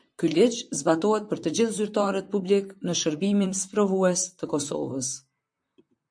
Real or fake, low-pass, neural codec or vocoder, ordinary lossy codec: fake; 9.9 kHz; vocoder, 44.1 kHz, 128 mel bands, Pupu-Vocoder; MP3, 64 kbps